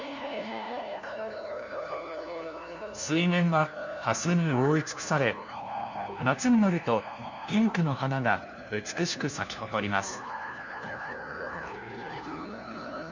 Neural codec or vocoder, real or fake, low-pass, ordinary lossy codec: codec, 16 kHz, 1 kbps, FunCodec, trained on LibriTTS, 50 frames a second; fake; 7.2 kHz; none